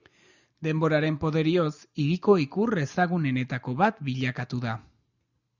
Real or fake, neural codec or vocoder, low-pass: real; none; 7.2 kHz